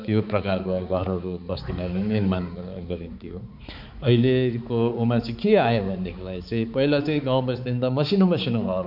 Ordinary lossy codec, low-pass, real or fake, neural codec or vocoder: none; 5.4 kHz; fake; codec, 16 kHz, 4 kbps, X-Codec, HuBERT features, trained on balanced general audio